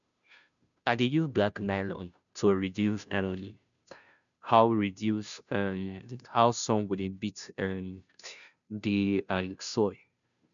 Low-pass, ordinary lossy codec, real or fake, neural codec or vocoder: 7.2 kHz; none; fake; codec, 16 kHz, 0.5 kbps, FunCodec, trained on Chinese and English, 25 frames a second